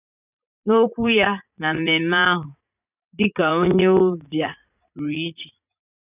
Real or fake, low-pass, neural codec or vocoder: fake; 3.6 kHz; vocoder, 44.1 kHz, 80 mel bands, Vocos